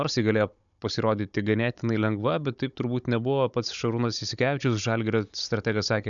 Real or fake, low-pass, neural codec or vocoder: fake; 7.2 kHz; codec, 16 kHz, 16 kbps, FunCodec, trained on Chinese and English, 50 frames a second